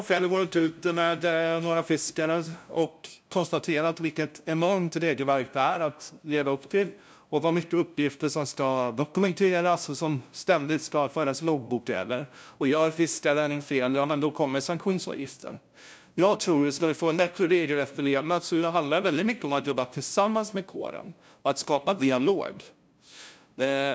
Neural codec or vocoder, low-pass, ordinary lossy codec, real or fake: codec, 16 kHz, 0.5 kbps, FunCodec, trained on LibriTTS, 25 frames a second; none; none; fake